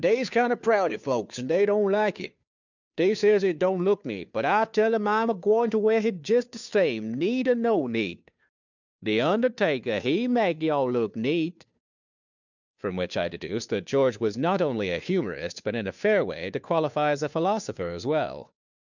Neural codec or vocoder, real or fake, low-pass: codec, 16 kHz, 2 kbps, FunCodec, trained on Chinese and English, 25 frames a second; fake; 7.2 kHz